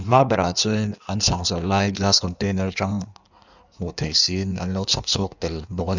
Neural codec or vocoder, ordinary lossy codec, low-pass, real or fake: codec, 16 kHz in and 24 kHz out, 1.1 kbps, FireRedTTS-2 codec; none; 7.2 kHz; fake